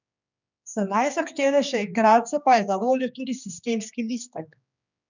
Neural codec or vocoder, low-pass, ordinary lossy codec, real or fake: codec, 16 kHz, 2 kbps, X-Codec, HuBERT features, trained on general audio; 7.2 kHz; none; fake